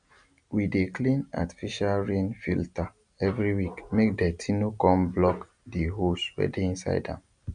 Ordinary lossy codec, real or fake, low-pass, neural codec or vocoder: none; real; 9.9 kHz; none